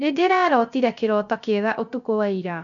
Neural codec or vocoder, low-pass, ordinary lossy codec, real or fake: codec, 16 kHz, 0.3 kbps, FocalCodec; 7.2 kHz; none; fake